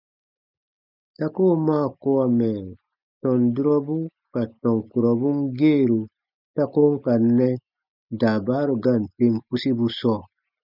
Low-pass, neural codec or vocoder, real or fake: 5.4 kHz; none; real